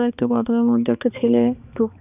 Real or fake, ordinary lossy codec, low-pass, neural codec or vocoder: fake; none; 3.6 kHz; codec, 16 kHz, 2 kbps, X-Codec, HuBERT features, trained on balanced general audio